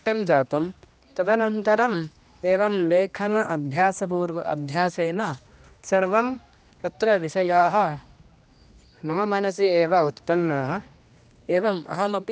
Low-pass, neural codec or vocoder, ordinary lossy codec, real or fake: none; codec, 16 kHz, 1 kbps, X-Codec, HuBERT features, trained on general audio; none; fake